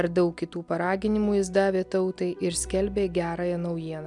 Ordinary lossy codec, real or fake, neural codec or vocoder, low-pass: MP3, 96 kbps; real; none; 10.8 kHz